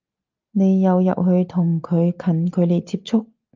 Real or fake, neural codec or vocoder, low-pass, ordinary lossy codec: real; none; 7.2 kHz; Opus, 32 kbps